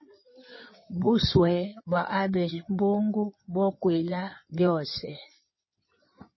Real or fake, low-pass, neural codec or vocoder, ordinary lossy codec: fake; 7.2 kHz; codec, 16 kHz in and 24 kHz out, 2.2 kbps, FireRedTTS-2 codec; MP3, 24 kbps